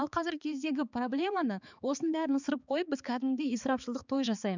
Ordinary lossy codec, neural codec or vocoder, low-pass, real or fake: none; codec, 16 kHz, 4 kbps, X-Codec, HuBERT features, trained on balanced general audio; 7.2 kHz; fake